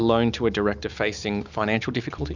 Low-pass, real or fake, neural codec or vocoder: 7.2 kHz; real; none